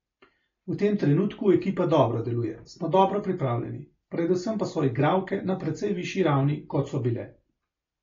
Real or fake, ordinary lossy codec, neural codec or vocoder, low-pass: real; AAC, 32 kbps; none; 7.2 kHz